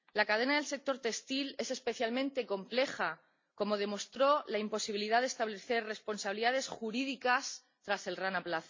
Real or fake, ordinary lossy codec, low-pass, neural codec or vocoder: real; MP3, 48 kbps; 7.2 kHz; none